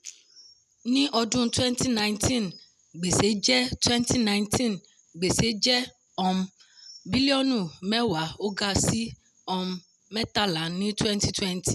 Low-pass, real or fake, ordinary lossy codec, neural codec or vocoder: 14.4 kHz; real; none; none